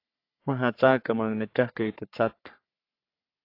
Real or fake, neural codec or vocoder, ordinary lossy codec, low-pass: fake; codec, 44.1 kHz, 3.4 kbps, Pupu-Codec; AAC, 32 kbps; 5.4 kHz